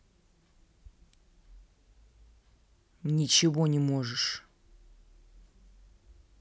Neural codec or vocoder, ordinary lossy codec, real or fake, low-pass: none; none; real; none